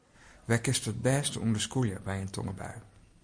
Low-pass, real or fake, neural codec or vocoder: 9.9 kHz; real; none